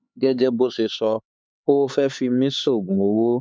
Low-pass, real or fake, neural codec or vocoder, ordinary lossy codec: none; fake; codec, 16 kHz, 4 kbps, X-Codec, HuBERT features, trained on LibriSpeech; none